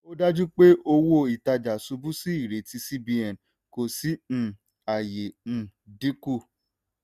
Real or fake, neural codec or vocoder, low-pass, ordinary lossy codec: real; none; 14.4 kHz; none